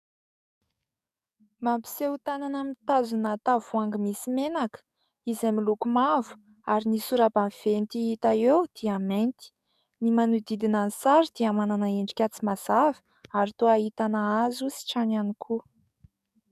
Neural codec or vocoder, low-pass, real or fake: codec, 44.1 kHz, 7.8 kbps, DAC; 14.4 kHz; fake